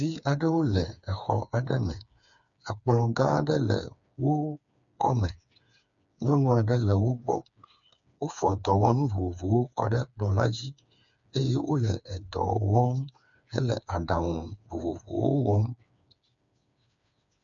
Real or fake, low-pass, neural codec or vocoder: fake; 7.2 kHz; codec, 16 kHz, 4 kbps, FreqCodec, smaller model